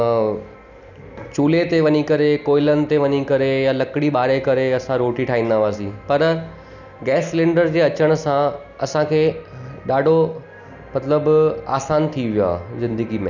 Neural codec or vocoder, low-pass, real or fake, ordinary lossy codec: none; 7.2 kHz; real; none